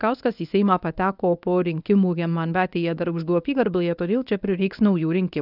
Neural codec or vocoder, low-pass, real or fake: codec, 24 kHz, 0.9 kbps, WavTokenizer, medium speech release version 1; 5.4 kHz; fake